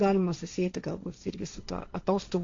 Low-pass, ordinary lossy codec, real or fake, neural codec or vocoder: 7.2 kHz; AAC, 48 kbps; fake; codec, 16 kHz, 1.1 kbps, Voila-Tokenizer